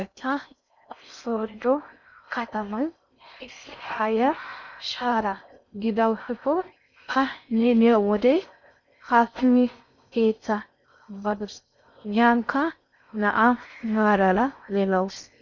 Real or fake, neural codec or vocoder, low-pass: fake; codec, 16 kHz in and 24 kHz out, 0.6 kbps, FocalCodec, streaming, 4096 codes; 7.2 kHz